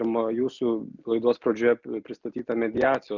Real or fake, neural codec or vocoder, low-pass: real; none; 7.2 kHz